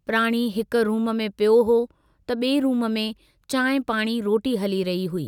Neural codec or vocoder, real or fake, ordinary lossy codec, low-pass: none; real; none; 19.8 kHz